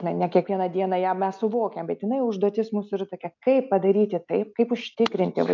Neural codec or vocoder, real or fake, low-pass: none; real; 7.2 kHz